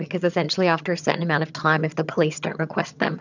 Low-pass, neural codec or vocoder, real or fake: 7.2 kHz; vocoder, 22.05 kHz, 80 mel bands, HiFi-GAN; fake